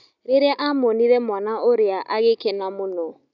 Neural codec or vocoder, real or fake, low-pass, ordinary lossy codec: none; real; 7.2 kHz; none